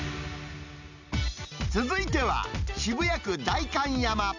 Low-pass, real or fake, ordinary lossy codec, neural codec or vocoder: 7.2 kHz; real; none; none